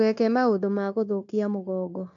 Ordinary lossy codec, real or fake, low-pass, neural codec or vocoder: AAC, 48 kbps; fake; 7.2 kHz; codec, 16 kHz, 0.9 kbps, LongCat-Audio-Codec